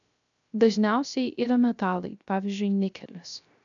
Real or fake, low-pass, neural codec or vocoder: fake; 7.2 kHz; codec, 16 kHz, 0.3 kbps, FocalCodec